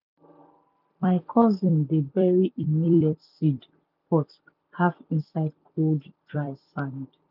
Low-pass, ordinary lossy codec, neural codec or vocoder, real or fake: 5.4 kHz; none; vocoder, 44.1 kHz, 128 mel bands every 256 samples, BigVGAN v2; fake